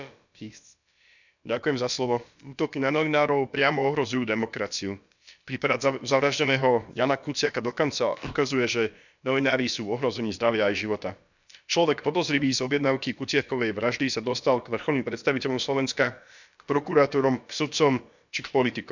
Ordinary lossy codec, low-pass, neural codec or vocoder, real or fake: none; 7.2 kHz; codec, 16 kHz, about 1 kbps, DyCAST, with the encoder's durations; fake